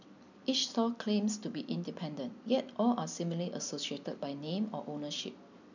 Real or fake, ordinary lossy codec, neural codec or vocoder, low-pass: real; none; none; 7.2 kHz